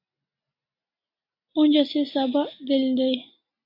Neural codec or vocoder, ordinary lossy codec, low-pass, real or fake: none; MP3, 32 kbps; 5.4 kHz; real